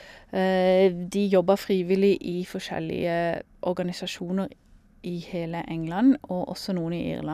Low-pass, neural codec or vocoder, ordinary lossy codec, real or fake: 14.4 kHz; none; none; real